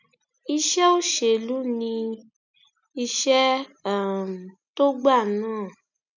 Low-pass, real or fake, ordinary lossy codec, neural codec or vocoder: 7.2 kHz; real; none; none